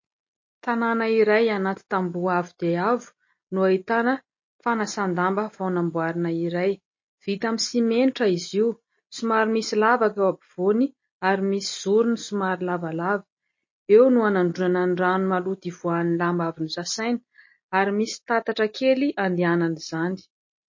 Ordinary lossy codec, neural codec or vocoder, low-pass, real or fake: MP3, 32 kbps; none; 7.2 kHz; real